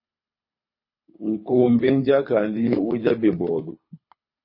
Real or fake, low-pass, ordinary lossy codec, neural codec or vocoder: fake; 5.4 kHz; MP3, 24 kbps; codec, 24 kHz, 3 kbps, HILCodec